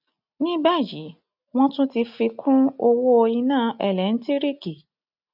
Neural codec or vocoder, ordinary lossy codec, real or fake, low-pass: none; none; real; 5.4 kHz